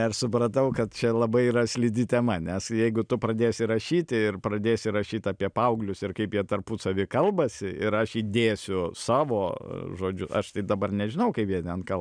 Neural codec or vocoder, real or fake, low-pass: none; real; 9.9 kHz